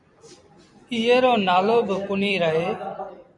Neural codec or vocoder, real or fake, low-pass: vocoder, 44.1 kHz, 128 mel bands every 256 samples, BigVGAN v2; fake; 10.8 kHz